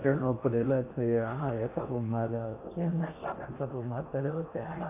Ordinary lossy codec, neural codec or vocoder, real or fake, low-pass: MP3, 32 kbps; codec, 16 kHz in and 24 kHz out, 0.6 kbps, FocalCodec, streaming, 4096 codes; fake; 3.6 kHz